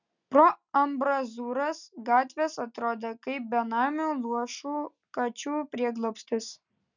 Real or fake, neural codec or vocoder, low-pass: real; none; 7.2 kHz